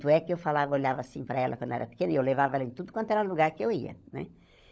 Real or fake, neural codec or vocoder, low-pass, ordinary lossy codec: fake; codec, 16 kHz, 16 kbps, FunCodec, trained on LibriTTS, 50 frames a second; none; none